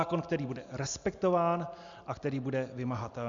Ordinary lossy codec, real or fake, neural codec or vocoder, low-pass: Opus, 64 kbps; real; none; 7.2 kHz